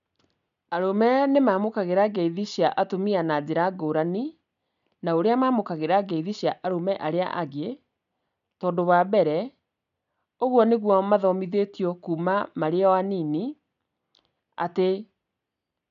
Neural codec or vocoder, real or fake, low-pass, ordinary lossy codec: none; real; 7.2 kHz; none